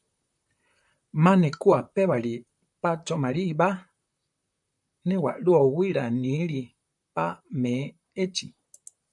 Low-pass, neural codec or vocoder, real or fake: 10.8 kHz; vocoder, 44.1 kHz, 128 mel bands, Pupu-Vocoder; fake